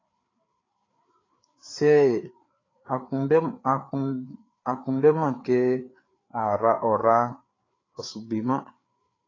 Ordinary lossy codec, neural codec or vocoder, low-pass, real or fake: AAC, 32 kbps; codec, 16 kHz, 4 kbps, FreqCodec, larger model; 7.2 kHz; fake